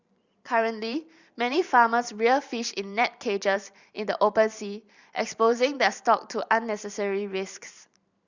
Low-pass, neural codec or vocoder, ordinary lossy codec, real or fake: 7.2 kHz; vocoder, 44.1 kHz, 128 mel bands every 512 samples, BigVGAN v2; Opus, 64 kbps; fake